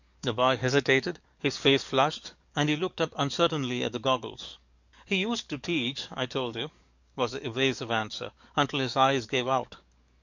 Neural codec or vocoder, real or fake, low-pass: codec, 44.1 kHz, 7.8 kbps, DAC; fake; 7.2 kHz